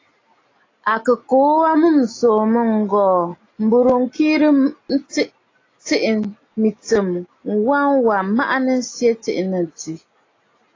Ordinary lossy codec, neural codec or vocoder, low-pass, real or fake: AAC, 32 kbps; none; 7.2 kHz; real